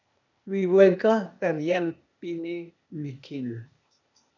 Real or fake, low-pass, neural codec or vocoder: fake; 7.2 kHz; codec, 16 kHz, 0.8 kbps, ZipCodec